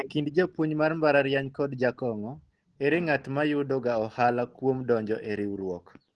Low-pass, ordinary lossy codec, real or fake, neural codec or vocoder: 10.8 kHz; Opus, 16 kbps; real; none